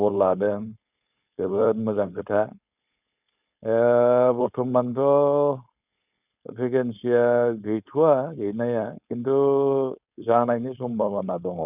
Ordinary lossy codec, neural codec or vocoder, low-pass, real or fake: none; codec, 16 kHz, 4.8 kbps, FACodec; 3.6 kHz; fake